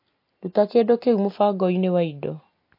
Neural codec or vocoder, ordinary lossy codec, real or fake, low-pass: none; MP3, 32 kbps; real; 5.4 kHz